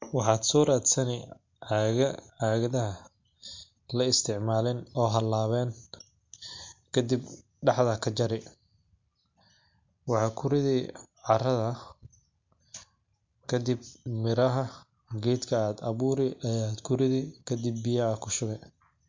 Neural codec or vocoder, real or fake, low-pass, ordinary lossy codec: none; real; 7.2 kHz; MP3, 48 kbps